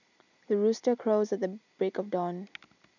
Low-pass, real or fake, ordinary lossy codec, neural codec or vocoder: 7.2 kHz; real; none; none